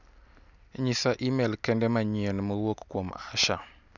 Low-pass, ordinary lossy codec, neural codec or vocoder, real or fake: 7.2 kHz; none; none; real